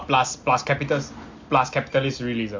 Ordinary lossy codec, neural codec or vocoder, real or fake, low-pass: MP3, 48 kbps; none; real; 7.2 kHz